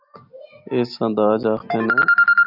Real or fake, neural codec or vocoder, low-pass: real; none; 5.4 kHz